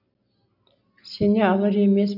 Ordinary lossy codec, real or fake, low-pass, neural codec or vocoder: none; real; 5.4 kHz; none